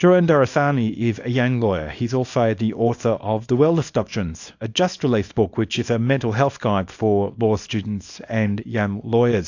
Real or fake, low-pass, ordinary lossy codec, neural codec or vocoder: fake; 7.2 kHz; AAC, 48 kbps; codec, 24 kHz, 0.9 kbps, WavTokenizer, small release